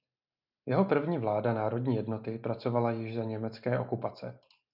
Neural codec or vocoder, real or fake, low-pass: none; real; 5.4 kHz